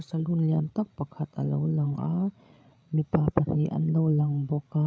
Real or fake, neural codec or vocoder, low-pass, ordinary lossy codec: fake; codec, 16 kHz, 16 kbps, FreqCodec, larger model; none; none